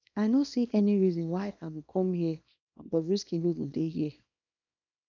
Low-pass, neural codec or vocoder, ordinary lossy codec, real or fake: 7.2 kHz; codec, 24 kHz, 0.9 kbps, WavTokenizer, small release; none; fake